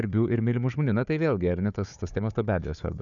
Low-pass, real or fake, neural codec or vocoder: 7.2 kHz; fake; codec, 16 kHz, 4 kbps, FunCodec, trained on Chinese and English, 50 frames a second